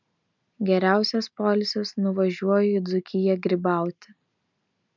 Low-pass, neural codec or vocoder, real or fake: 7.2 kHz; none; real